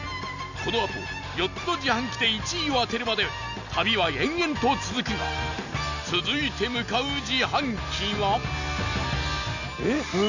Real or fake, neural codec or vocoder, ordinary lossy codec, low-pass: real; none; none; 7.2 kHz